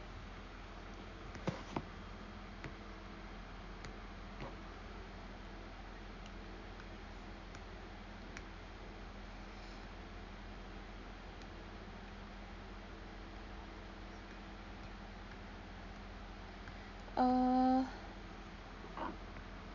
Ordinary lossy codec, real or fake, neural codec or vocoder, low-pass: none; real; none; 7.2 kHz